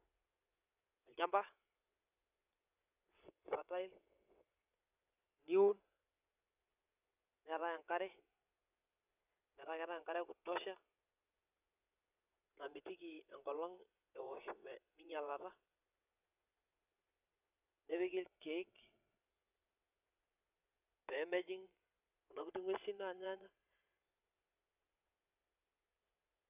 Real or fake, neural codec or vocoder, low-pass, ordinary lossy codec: fake; vocoder, 22.05 kHz, 80 mel bands, WaveNeXt; 3.6 kHz; none